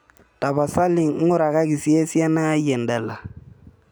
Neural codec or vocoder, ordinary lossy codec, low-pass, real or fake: none; none; none; real